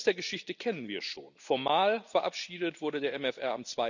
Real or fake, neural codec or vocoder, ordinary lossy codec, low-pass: real; none; none; 7.2 kHz